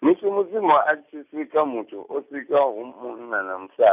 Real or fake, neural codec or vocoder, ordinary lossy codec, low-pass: real; none; none; 3.6 kHz